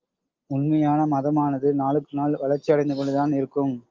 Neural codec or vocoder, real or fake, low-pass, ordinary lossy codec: none; real; 7.2 kHz; Opus, 32 kbps